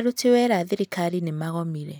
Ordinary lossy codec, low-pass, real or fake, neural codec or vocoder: none; none; real; none